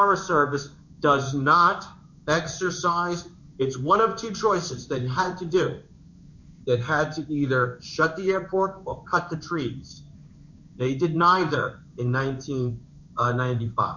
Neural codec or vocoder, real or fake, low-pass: codec, 16 kHz in and 24 kHz out, 1 kbps, XY-Tokenizer; fake; 7.2 kHz